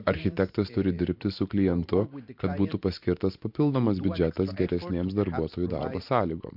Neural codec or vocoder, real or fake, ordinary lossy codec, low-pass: vocoder, 44.1 kHz, 128 mel bands every 512 samples, BigVGAN v2; fake; MP3, 48 kbps; 5.4 kHz